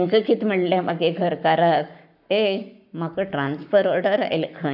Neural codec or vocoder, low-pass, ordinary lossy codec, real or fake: codec, 44.1 kHz, 7.8 kbps, Pupu-Codec; 5.4 kHz; none; fake